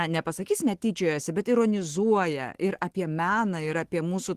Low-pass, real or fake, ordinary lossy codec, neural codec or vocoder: 14.4 kHz; fake; Opus, 16 kbps; autoencoder, 48 kHz, 128 numbers a frame, DAC-VAE, trained on Japanese speech